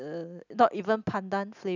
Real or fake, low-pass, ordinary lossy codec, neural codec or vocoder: real; 7.2 kHz; none; none